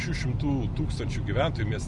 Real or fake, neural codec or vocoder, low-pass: real; none; 10.8 kHz